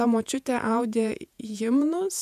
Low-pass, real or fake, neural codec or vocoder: 14.4 kHz; fake; vocoder, 48 kHz, 128 mel bands, Vocos